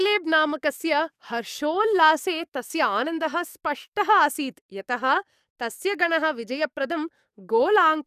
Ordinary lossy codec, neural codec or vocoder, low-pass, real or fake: none; codec, 44.1 kHz, 7.8 kbps, DAC; 14.4 kHz; fake